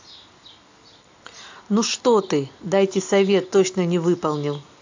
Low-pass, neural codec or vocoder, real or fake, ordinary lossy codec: 7.2 kHz; none; real; AAC, 48 kbps